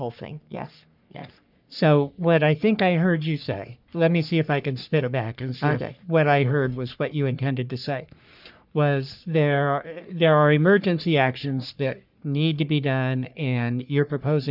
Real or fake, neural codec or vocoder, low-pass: fake; codec, 44.1 kHz, 3.4 kbps, Pupu-Codec; 5.4 kHz